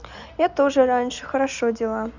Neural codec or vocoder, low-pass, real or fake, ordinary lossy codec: none; 7.2 kHz; real; none